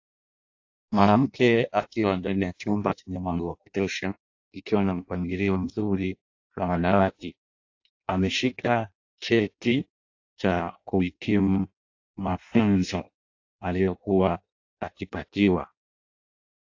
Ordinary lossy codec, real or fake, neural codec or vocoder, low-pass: AAC, 48 kbps; fake; codec, 16 kHz in and 24 kHz out, 0.6 kbps, FireRedTTS-2 codec; 7.2 kHz